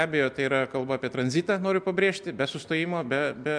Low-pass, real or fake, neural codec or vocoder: 9.9 kHz; real; none